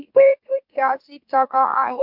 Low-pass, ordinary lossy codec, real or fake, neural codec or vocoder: 5.4 kHz; MP3, 48 kbps; fake; autoencoder, 44.1 kHz, a latent of 192 numbers a frame, MeloTTS